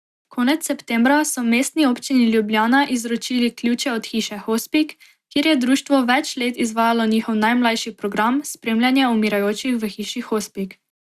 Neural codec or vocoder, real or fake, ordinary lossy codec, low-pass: none; real; Opus, 64 kbps; 14.4 kHz